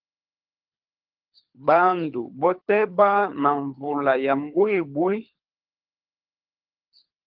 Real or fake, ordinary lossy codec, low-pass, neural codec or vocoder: fake; Opus, 32 kbps; 5.4 kHz; codec, 24 kHz, 3 kbps, HILCodec